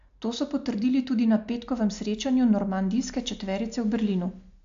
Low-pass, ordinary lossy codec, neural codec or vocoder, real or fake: 7.2 kHz; AAC, 64 kbps; none; real